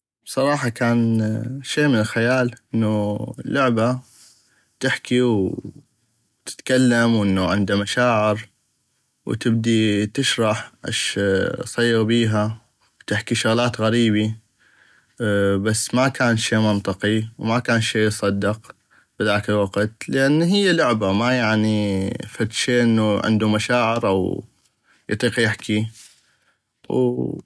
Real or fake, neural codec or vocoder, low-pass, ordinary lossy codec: real; none; none; none